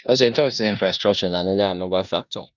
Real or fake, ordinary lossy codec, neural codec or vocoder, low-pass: fake; none; codec, 16 kHz in and 24 kHz out, 0.9 kbps, LongCat-Audio-Codec, four codebook decoder; 7.2 kHz